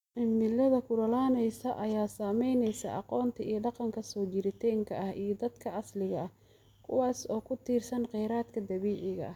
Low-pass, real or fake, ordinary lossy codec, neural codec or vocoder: 19.8 kHz; real; none; none